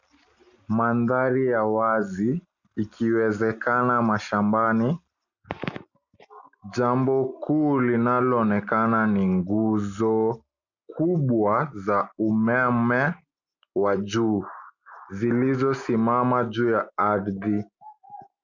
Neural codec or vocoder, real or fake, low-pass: none; real; 7.2 kHz